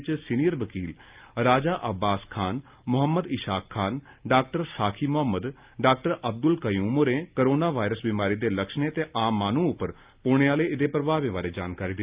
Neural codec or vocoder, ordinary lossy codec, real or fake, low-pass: none; Opus, 24 kbps; real; 3.6 kHz